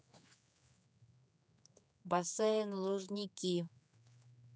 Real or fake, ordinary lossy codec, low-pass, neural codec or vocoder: fake; none; none; codec, 16 kHz, 4 kbps, X-Codec, HuBERT features, trained on general audio